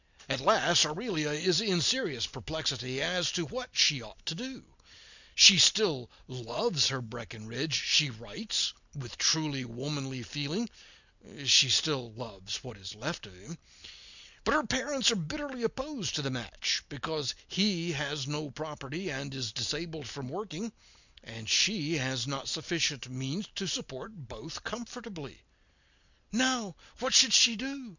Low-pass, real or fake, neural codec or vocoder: 7.2 kHz; real; none